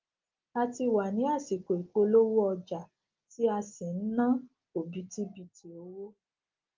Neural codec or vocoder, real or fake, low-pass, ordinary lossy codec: none; real; 7.2 kHz; Opus, 24 kbps